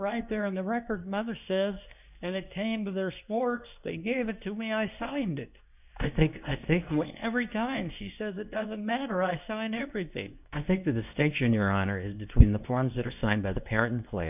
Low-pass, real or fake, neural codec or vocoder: 3.6 kHz; fake; codec, 24 kHz, 0.9 kbps, WavTokenizer, medium speech release version 2